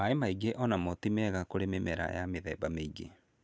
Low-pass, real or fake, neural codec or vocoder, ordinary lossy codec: none; real; none; none